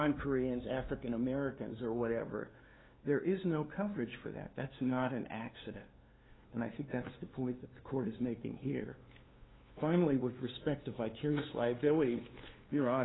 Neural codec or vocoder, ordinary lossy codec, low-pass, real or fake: codec, 16 kHz, 2 kbps, FunCodec, trained on LibriTTS, 25 frames a second; AAC, 16 kbps; 7.2 kHz; fake